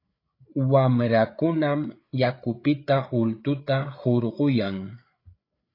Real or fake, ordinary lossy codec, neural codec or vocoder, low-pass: fake; AAC, 32 kbps; codec, 16 kHz, 8 kbps, FreqCodec, larger model; 5.4 kHz